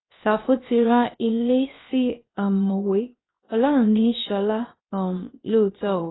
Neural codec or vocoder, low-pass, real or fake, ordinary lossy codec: codec, 16 kHz, 0.7 kbps, FocalCodec; 7.2 kHz; fake; AAC, 16 kbps